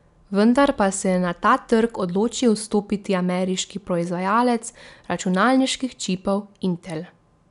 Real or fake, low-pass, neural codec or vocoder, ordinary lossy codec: real; 10.8 kHz; none; none